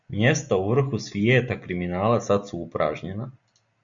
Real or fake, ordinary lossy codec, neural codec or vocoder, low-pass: real; Opus, 64 kbps; none; 7.2 kHz